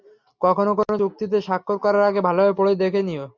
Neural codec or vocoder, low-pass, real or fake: none; 7.2 kHz; real